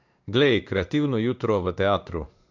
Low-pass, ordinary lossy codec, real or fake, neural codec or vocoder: 7.2 kHz; none; fake; codec, 16 kHz in and 24 kHz out, 1 kbps, XY-Tokenizer